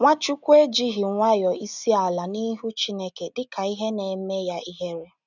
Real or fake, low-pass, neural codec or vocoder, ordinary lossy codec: real; 7.2 kHz; none; none